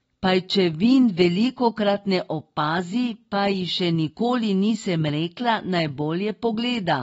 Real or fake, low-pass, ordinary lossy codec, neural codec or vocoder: real; 19.8 kHz; AAC, 24 kbps; none